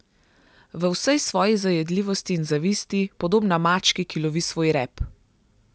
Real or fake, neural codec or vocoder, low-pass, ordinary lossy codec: real; none; none; none